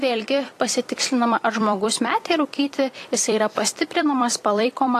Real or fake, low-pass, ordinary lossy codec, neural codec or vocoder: fake; 14.4 kHz; AAC, 48 kbps; vocoder, 44.1 kHz, 128 mel bands every 256 samples, BigVGAN v2